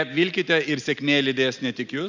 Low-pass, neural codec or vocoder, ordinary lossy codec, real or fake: 7.2 kHz; none; Opus, 64 kbps; real